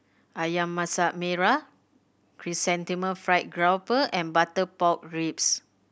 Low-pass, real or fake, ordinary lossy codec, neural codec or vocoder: none; real; none; none